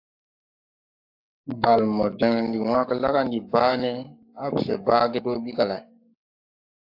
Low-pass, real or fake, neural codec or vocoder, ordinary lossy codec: 5.4 kHz; fake; codec, 44.1 kHz, 7.8 kbps, Pupu-Codec; AAC, 48 kbps